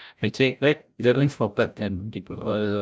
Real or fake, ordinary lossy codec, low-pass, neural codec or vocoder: fake; none; none; codec, 16 kHz, 0.5 kbps, FreqCodec, larger model